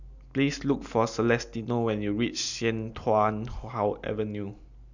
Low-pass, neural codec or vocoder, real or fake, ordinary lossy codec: 7.2 kHz; none; real; none